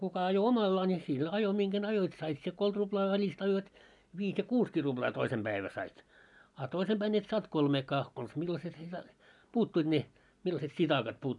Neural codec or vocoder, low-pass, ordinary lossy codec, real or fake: none; 10.8 kHz; none; real